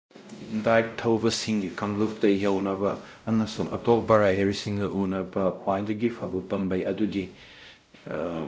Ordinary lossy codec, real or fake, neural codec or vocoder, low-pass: none; fake; codec, 16 kHz, 0.5 kbps, X-Codec, WavLM features, trained on Multilingual LibriSpeech; none